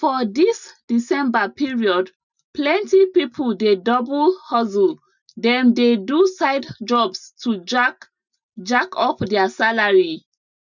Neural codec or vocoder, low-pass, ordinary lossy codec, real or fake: none; 7.2 kHz; none; real